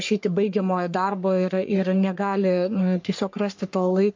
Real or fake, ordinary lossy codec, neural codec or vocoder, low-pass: fake; MP3, 48 kbps; codec, 44.1 kHz, 3.4 kbps, Pupu-Codec; 7.2 kHz